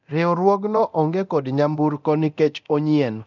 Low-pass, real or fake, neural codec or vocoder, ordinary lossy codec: 7.2 kHz; fake; codec, 24 kHz, 0.9 kbps, DualCodec; none